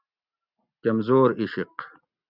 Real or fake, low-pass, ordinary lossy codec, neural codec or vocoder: real; 5.4 kHz; Opus, 64 kbps; none